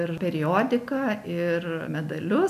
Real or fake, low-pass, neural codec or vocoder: real; 14.4 kHz; none